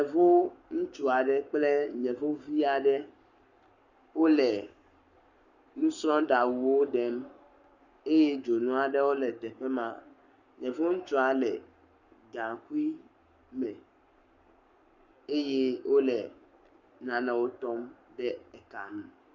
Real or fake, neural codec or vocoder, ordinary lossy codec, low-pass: fake; codec, 44.1 kHz, 7.8 kbps, Pupu-Codec; Opus, 64 kbps; 7.2 kHz